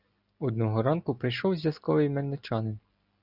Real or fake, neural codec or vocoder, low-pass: real; none; 5.4 kHz